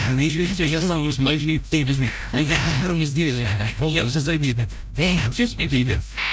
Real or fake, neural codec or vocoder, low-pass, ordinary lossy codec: fake; codec, 16 kHz, 0.5 kbps, FreqCodec, larger model; none; none